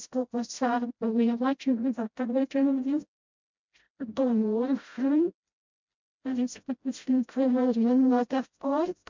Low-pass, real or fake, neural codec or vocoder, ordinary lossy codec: 7.2 kHz; fake; codec, 16 kHz, 0.5 kbps, FreqCodec, smaller model; MP3, 64 kbps